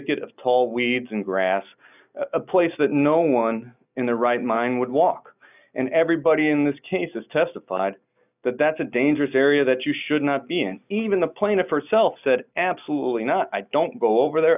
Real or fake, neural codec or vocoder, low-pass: real; none; 3.6 kHz